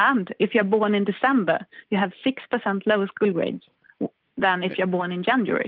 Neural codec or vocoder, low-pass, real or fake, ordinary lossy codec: none; 5.4 kHz; real; Opus, 24 kbps